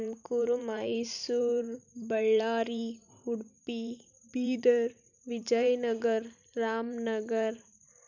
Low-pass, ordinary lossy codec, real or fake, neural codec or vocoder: 7.2 kHz; none; fake; vocoder, 44.1 kHz, 128 mel bands every 512 samples, BigVGAN v2